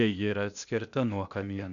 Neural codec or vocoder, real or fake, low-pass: codec, 16 kHz, 0.8 kbps, ZipCodec; fake; 7.2 kHz